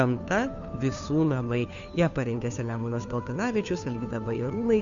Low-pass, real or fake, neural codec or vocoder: 7.2 kHz; fake; codec, 16 kHz, 2 kbps, FunCodec, trained on Chinese and English, 25 frames a second